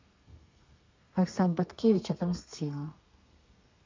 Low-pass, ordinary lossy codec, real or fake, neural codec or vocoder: 7.2 kHz; MP3, 64 kbps; fake; codec, 32 kHz, 1.9 kbps, SNAC